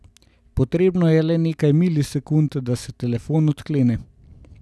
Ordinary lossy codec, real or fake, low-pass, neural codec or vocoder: none; real; none; none